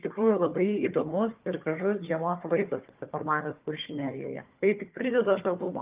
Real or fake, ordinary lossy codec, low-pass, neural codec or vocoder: fake; Opus, 32 kbps; 3.6 kHz; codec, 16 kHz, 4 kbps, FunCodec, trained on Chinese and English, 50 frames a second